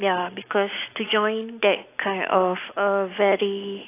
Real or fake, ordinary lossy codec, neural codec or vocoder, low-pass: real; AAC, 24 kbps; none; 3.6 kHz